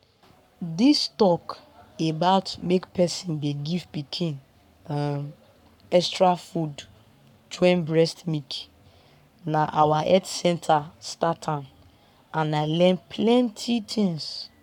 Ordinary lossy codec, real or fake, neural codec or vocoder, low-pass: none; fake; codec, 44.1 kHz, 7.8 kbps, Pupu-Codec; 19.8 kHz